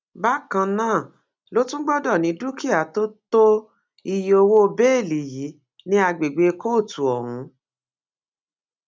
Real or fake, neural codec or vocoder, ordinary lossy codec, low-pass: real; none; none; none